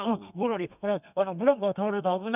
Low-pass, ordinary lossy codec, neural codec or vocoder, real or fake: 3.6 kHz; none; codec, 32 kHz, 1.9 kbps, SNAC; fake